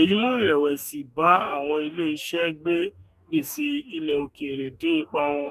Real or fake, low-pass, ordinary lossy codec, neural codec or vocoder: fake; 14.4 kHz; none; codec, 44.1 kHz, 2.6 kbps, DAC